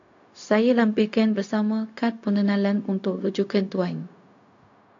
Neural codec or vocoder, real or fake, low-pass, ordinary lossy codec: codec, 16 kHz, 0.4 kbps, LongCat-Audio-Codec; fake; 7.2 kHz; AAC, 48 kbps